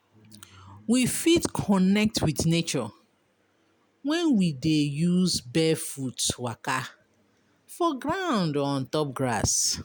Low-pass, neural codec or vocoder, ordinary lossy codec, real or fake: none; none; none; real